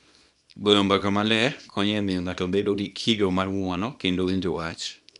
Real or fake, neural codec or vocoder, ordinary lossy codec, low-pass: fake; codec, 24 kHz, 0.9 kbps, WavTokenizer, small release; none; 10.8 kHz